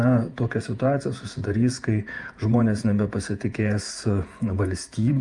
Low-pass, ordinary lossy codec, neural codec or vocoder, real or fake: 10.8 kHz; Opus, 32 kbps; vocoder, 48 kHz, 128 mel bands, Vocos; fake